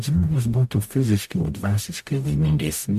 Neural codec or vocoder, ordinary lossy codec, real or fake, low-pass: codec, 44.1 kHz, 0.9 kbps, DAC; MP3, 64 kbps; fake; 14.4 kHz